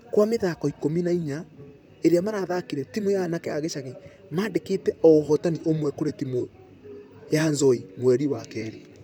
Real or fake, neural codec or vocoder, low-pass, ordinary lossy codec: fake; vocoder, 44.1 kHz, 128 mel bands, Pupu-Vocoder; none; none